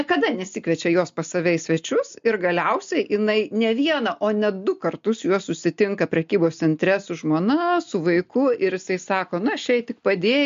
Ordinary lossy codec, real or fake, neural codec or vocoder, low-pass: MP3, 48 kbps; real; none; 7.2 kHz